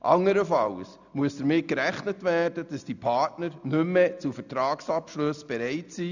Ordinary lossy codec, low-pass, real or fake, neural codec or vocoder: none; 7.2 kHz; real; none